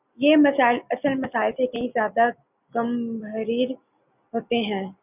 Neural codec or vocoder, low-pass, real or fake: none; 3.6 kHz; real